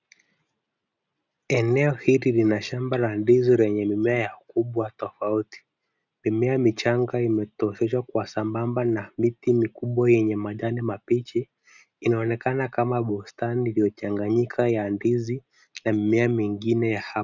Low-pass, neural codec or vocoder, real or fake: 7.2 kHz; none; real